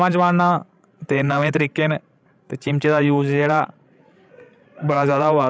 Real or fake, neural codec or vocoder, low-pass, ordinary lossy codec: fake; codec, 16 kHz, 16 kbps, FreqCodec, larger model; none; none